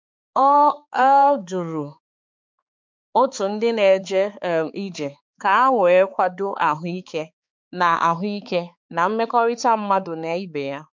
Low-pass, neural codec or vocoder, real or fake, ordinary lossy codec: 7.2 kHz; codec, 16 kHz, 4 kbps, X-Codec, HuBERT features, trained on balanced general audio; fake; MP3, 64 kbps